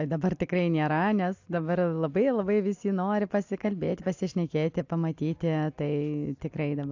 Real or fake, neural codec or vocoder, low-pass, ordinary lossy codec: real; none; 7.2 kHz; AAC, 48 kbps